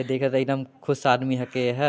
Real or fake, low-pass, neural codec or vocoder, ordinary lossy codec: real; none; none; none